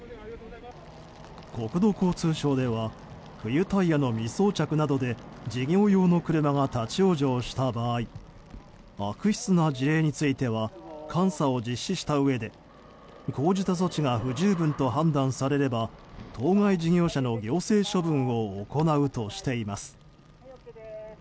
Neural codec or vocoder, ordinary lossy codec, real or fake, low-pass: none; none; real; none